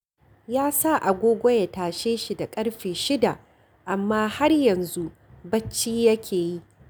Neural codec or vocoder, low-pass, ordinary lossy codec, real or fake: none; none; none; real